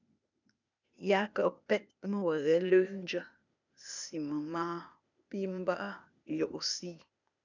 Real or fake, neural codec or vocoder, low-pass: fake; codec, 16 kHz, 0.8 kbps, ZipCodec; 7.2 kHz